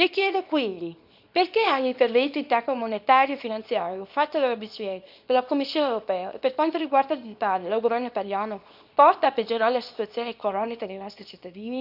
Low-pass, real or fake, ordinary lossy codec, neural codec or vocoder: 5.4 kHz; fake; none; codec, 24 kHz, 0.9 kbps, WavTokenizer, small release